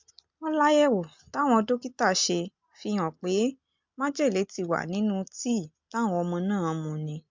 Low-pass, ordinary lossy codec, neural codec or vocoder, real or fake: 7.2 kHz; MP3, 64 kbps; none; real